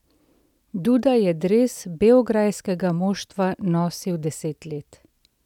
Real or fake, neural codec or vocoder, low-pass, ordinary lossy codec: real; none; 19.8 kHz; none